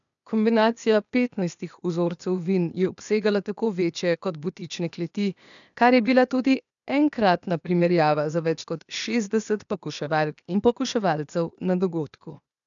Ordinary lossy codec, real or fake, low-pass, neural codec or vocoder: none; fake; 7.2 kHz; codec, 16 kHz, 0.8 kbps, ZipCodec